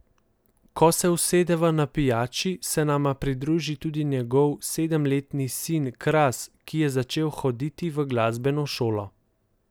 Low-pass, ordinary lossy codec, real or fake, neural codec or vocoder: none; none; real; none